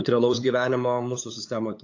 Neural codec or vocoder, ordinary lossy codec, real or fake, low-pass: codec, 16 kHz, 8 kbps, FunCodec, trained on LibriTTS, 25 frames a second; AAC, 48 kbps; fake; 7.2 kHz